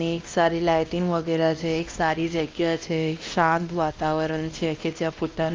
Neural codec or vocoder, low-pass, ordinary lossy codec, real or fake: codec, 16 kHz, 1 kbps, X-Codec, WavLM features, trained on Multilingual LibriSpeech; 7.2 kHz; Opus, 32 kbps; fake